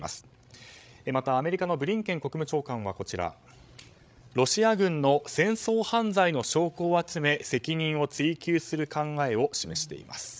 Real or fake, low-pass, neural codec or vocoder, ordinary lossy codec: fake; none; codec, 16 kHz, 16 kbps, FreqCodec, larger model; none